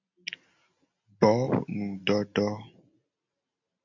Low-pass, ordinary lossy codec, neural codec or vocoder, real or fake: 7.2 kHz; MP3, 48 kbps; none; real